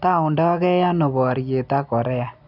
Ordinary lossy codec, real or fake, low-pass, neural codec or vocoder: none; real; 5.4 kHz; none